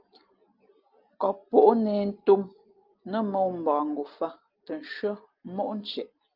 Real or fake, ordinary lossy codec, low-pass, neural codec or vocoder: real; Opus, 32 kbps; 5.4 kHz; none